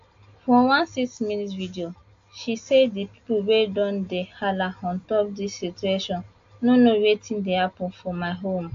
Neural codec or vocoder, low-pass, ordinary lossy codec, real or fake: none; 7.2 kHz; AAC, 48 kbps; real